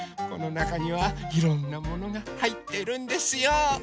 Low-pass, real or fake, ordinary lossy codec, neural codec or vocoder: none; real; none; none